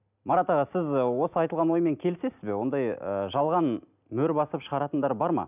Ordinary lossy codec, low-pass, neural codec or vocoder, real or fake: none; 3.6 kHz; none; real